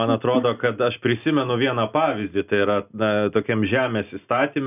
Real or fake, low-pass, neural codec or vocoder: real; 3.6 kHz; none